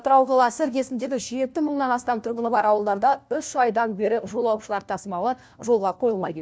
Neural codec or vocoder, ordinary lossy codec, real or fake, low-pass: codec, 16 kHz, 1 kbps, FunCodec, trained on LibriTTS, 50 frames a second; none; fake; none